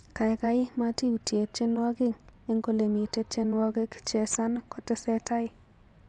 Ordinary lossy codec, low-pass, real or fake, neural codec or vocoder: none; 10.8 kHz; fake; vocoder, 24 kHz, 100 mel bands, Vocos